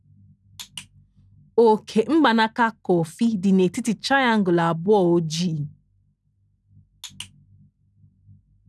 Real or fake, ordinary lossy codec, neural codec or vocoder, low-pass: real; none; none; none